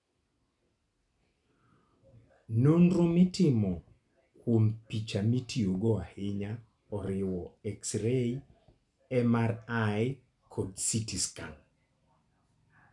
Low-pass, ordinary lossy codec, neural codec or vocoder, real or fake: 10.8 kHz; none; none; real